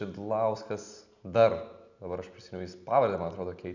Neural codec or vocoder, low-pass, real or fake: none; 7.2 kHz; real